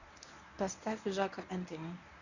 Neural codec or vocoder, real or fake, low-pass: codec, 24 kHz, 0.9 kbps, WavTokenizer, medium speech release version 1; fake; 7.2 kHz